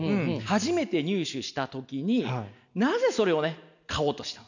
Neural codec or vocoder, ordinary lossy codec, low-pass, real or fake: none; none; 7.2 kHz; real